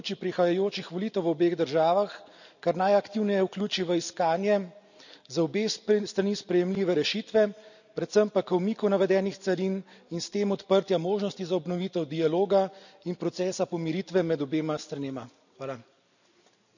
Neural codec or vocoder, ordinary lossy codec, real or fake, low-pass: none; none; real; 7.2 kHz